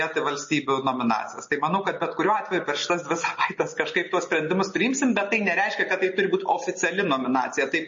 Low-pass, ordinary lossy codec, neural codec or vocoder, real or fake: 7.2 kHz; MP3, 32 kbps; none; real